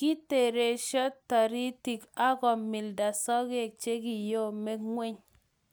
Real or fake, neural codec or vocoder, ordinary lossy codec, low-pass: real; none; none; none